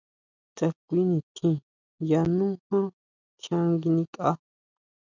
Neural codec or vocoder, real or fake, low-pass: none; real; 7.2 kHz